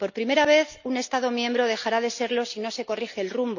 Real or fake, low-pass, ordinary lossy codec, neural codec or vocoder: real; 7.2 kHz; none; none